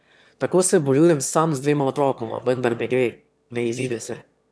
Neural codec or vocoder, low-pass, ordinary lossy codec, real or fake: autoencoder, 22.05 kHz, a latent of 192 numbers a frame, VITS, trained on one speaker; none; none; fake